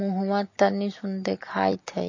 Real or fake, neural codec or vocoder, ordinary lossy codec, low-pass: fake; vocoder, 44.1 kHz, 128 mel bands every 256 samples, BigVGAN v2; MP3, 32 kbps; 7.2 kHz